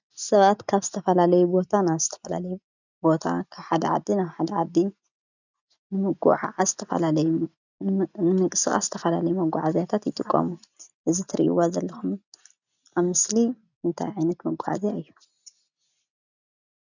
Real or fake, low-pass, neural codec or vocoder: real; 7.2 kHz; none